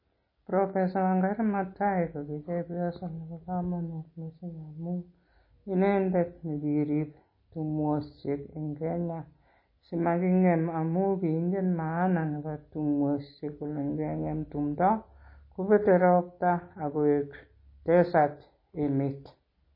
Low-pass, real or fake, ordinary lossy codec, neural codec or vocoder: 5.4 kHz; real; MP3, 24 kbps; none